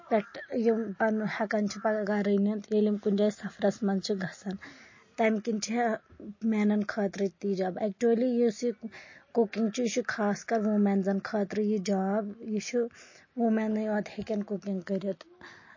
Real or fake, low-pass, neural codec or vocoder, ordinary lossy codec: real; 7.2 kHz; none; MP3, 32 kbps